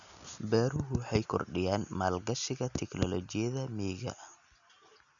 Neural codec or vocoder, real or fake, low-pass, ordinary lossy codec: none; real; 7.2 kHz; none